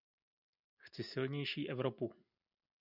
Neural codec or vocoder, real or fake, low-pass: none; real; 5.4 kHz